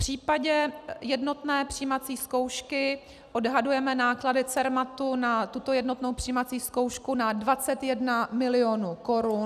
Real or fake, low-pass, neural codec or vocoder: real; 14.4 kHz; none